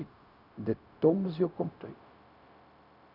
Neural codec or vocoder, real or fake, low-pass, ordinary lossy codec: codec, 16 kHz, 0.4 kbps, LongCat-Audio-Codec; fake; 5.4 kHz; none